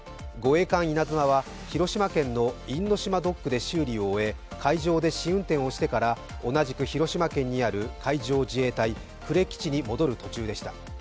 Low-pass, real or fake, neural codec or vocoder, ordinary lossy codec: none; real; none; none